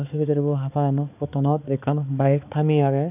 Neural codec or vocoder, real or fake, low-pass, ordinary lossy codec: codec, 16 kHz, 4 kbps, X-Codec, HuBERT features, trained on balanced general audio; fake; 3.6 kHz; none